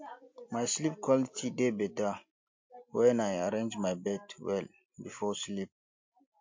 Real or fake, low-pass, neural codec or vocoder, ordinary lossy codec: real; 7.2 kHz; none; MP3, 48 kbps